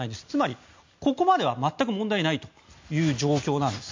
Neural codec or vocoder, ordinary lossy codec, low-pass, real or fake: none; MP3, 48 kbps; 7.2 kHz; real